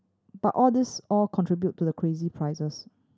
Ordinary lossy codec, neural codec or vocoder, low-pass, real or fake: none; none; none; real